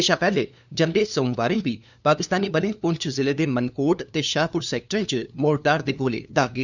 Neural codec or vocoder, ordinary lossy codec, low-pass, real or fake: codec, 16 kHz, 2 kbps, FunCodec, trained on LibriTTS, 25 frames a second; none; 7.2 kHz; fake